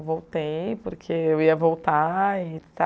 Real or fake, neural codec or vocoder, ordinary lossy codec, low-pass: real; none; none; none